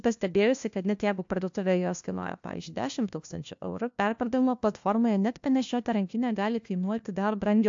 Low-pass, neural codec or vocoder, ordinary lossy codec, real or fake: 7.2 kHz; codec, 16 kHz, 1 kbps, FunCodec, trained on LibriTTS, 50 frames a second; AAC, 64 kbps; fake